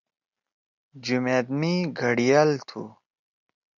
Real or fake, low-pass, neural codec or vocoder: real; 7.2 kHz; none